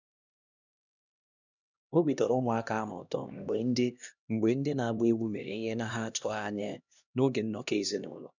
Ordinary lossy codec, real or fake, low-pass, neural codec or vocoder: none; fake; 7.2 kHz; codec, 16 kHz, 1 kbps, X-Codec, HuBERT features, trained on LibriSpeech